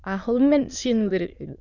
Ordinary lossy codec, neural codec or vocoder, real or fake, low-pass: none; autoencoder, 22.05 kHz, a latent of 192 numbers a frame, VITS, trained on many speakers; fake; 7.2 kHz